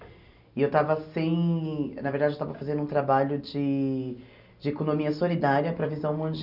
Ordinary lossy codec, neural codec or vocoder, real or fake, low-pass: none; none; real; 5.4 kHz